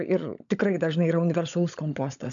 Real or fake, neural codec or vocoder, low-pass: fake; codec, 16 kHz, 16 kbps, FunCodec, trained on LibriTTS, 50 frames a second; 7.2 kHz